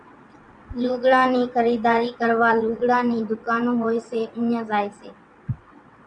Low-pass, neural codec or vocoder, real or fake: 9.9 kHz; vocoder, 22.05 kHz, 80 mel bands, WaveNeXt; fake